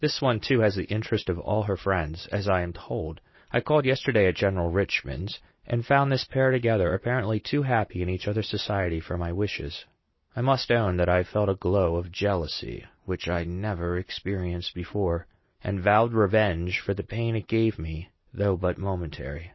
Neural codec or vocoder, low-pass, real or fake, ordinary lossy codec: none; 7.2 kHz; real; MP3, 24 kbps